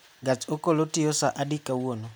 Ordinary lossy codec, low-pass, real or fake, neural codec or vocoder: none; none; real; none